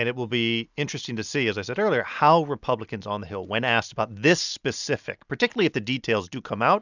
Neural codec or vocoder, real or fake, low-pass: none; real; 7.2 kHz